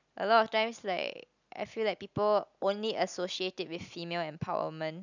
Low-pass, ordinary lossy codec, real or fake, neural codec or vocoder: 7.2 kHz; none; real; none